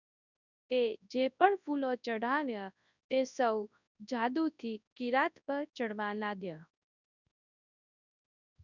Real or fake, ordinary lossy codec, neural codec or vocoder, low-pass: fake; none; codec, 24 kHz, 0.9 kbps, WavTokenizer, large speech release; 7.2 kHz